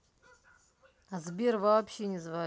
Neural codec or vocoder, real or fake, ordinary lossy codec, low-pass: none; real; none; none